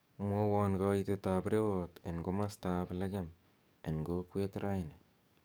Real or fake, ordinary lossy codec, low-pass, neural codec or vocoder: fake; none; none; codec, 44.1 kHz, 7.8 kbps, DAC